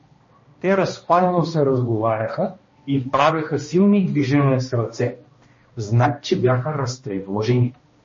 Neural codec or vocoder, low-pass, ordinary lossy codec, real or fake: codec, 16 kHz, 1 kbps, X-Codec, HuBERT features, trained on balanced general audio; 7.2 kHz; MP3, 32 kbps; fake